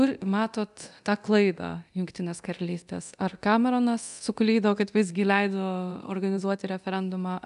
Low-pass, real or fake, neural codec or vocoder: 10.8 kHz; fake; codec, 24 kHz, 0.9 kbps, DualCodec